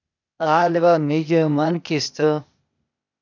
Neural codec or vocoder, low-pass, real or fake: codec, 16 kHz, 0.8 kbps, ZipCodec; 7.2 kHz; fake